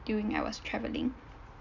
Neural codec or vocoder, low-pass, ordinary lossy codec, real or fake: none; 7.2 kHz; none; real